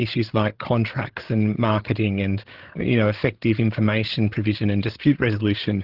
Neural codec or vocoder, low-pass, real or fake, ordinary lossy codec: codec, 16 kHz, 16 kbps, FreqCodec, larger model; 5.4 kHz; fake; Opus, 16 kbps